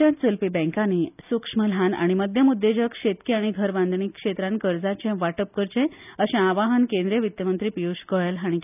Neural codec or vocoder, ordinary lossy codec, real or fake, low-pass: none; none; real; 3.6 kHz